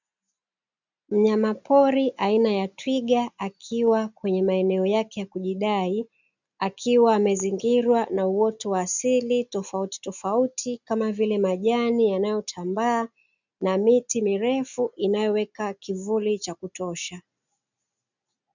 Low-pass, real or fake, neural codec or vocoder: 7.2 kHz; real; none